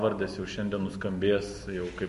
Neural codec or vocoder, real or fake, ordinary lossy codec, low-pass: none; real; MP3, 48 kbps; 14.4 kHz